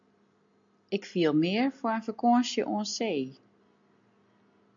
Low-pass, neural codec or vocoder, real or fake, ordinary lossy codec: 7.2 kHz; none; real; AAC, 64 kbps